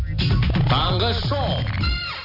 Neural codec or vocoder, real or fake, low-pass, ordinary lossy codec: none; real; 5.4 kHz; none